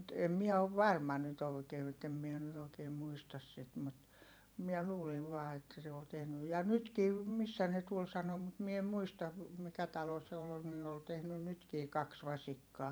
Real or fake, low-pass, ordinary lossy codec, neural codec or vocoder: fake; none; none; vocoder, 44.1 kHz, 128 mel bands every 512 samples, BigVGAN v2